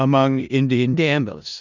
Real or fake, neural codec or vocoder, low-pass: fake; codec, 16 kHz in and 24 kHz out, 0.4 kbps, LongCat-Audio-Codec, four codebook decoder; 7.2 kHz